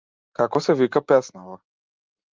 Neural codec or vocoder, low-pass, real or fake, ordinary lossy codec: none; 7.2 kHz; real; Opus, 32 kbps